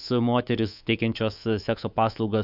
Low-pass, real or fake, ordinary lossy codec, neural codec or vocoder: 5.4 kHz; fake; AAC, 48 kbps; codec, 24 kHz, 3.1 kbps, DualCodec